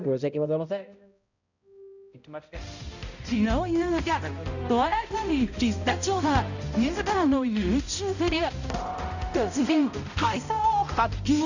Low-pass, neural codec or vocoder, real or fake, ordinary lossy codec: 7.2 kHz; codec, 16 kHz, 0.5 kbps, X-Codec, HuBERT features, trained on balanced general audio; fake; none